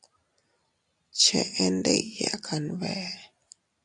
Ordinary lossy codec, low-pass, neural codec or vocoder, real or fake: AAC, 64 kbps; 10.8 kHz; none; real